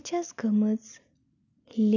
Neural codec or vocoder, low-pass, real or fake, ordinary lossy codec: none; 7.2 kHz; real; none